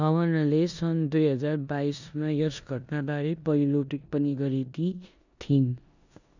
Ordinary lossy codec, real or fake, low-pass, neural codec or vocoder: none; fake; 7.2 kHz; codec, 16 kHz in and 24 kHz out, 0.9 kbps, LongCat-Audio-Codec, four codebook decoder